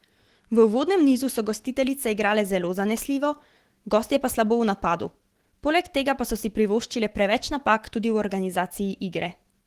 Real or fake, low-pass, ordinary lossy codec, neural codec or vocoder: fake; 14.4 kHz; Opus, 16 kbps; autoencoder, 48 kHz, 128 numbers a frame, DAC-VAE, trained on Japanese speech